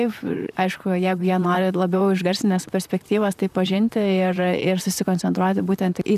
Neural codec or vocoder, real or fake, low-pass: vocoder, 44.1 kHz, 128 mel bands, Pupu-Vocoder; fake; 14.4 kHz